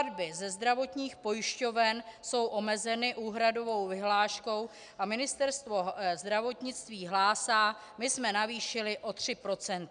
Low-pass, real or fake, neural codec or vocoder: 9.9 kHz; real; none